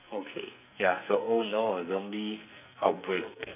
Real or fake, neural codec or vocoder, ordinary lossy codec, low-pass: fake; codec, 44.1 kHz, 2.6 kbps, SNAC; none; 3.6 kHz